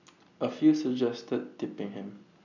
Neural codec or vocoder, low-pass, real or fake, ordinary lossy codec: none; 7.2 kHz; real; none